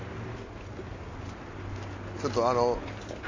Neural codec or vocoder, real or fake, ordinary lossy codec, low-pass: none; real; AAC, 32 kbps; 7.2 kHz